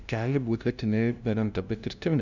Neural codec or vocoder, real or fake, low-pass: codec, 16 kHz, 0.5 kbps, FunCodec, trained on LibriTTS, 25 frames a second; fake; 7.2 kHz